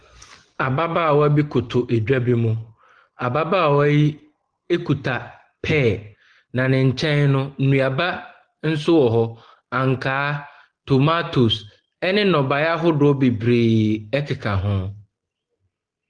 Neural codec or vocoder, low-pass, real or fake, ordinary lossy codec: none; 9.9 kHz; real; Opus, 16 kbps